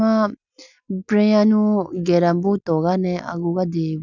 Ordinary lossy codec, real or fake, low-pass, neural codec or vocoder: none; real; 7.2 kHz; none